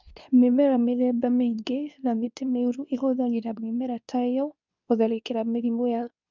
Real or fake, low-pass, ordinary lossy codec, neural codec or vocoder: fake; 7.2 kHz; none; codec, 24 kHz, 0.9 kbps, WavTokenizer, medium speech release version 2